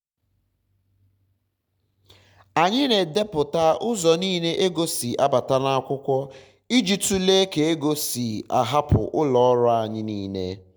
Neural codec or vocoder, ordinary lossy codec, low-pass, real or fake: none; none; none; real